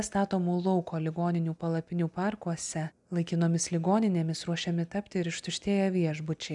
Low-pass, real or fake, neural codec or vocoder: 10.8 kHz; real; none